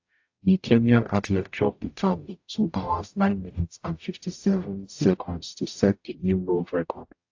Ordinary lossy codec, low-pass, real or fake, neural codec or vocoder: AAC, 48 kbps; 7.2 kHz; fake; codec, 44.1 kHz, 0.9 kbps, DAC